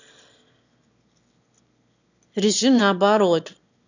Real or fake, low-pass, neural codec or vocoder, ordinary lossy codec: fake; 7.2 kHz; autoencoder, 22.05 kHz, a latent of 192 numbers a frame, VITS, trained on one speaker; none